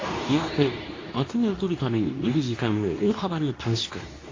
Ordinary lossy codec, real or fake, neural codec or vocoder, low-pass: AAC, 32 kbps; fake; codec, 24 kHz, 0.9 kbps, WavTokenizer, medium speech release version 2; 7.2 kHz